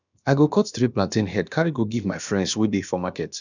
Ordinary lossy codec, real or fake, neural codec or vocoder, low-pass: none; fake; codec, 16 kHz, about 1 kbps, DyCAST, with the encoder's durations; 7.2 kHz